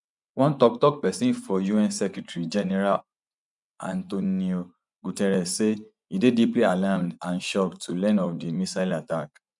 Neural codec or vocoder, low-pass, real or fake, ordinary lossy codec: vocoder, 24 kHz, 100 mel bands, Vocos; 10.8 kHz; fake; none